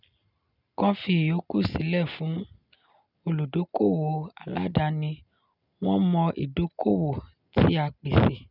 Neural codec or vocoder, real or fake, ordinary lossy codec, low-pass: none; real; none; 5.4 kHz